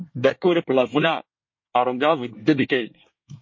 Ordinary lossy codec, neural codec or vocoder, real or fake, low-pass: MP3, 32 kbps; codec, 24 kHz, 1 kbps, SNAC; fake; 7.2 kHz